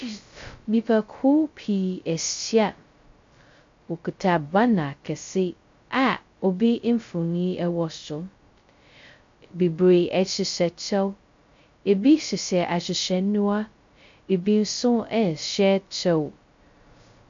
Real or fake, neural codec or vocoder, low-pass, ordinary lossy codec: fake; codec, 16 kHz, 0.2 kbps, FocalCodec; 7.2 kHz; MP3, 48 kbps